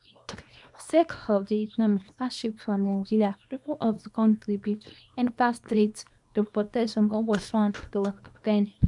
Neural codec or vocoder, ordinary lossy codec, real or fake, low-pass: codec, 24 kHz, 0.9 kbps, WavTokenizer, small release; MP3, 96 kbps; fake; 10.8 kHz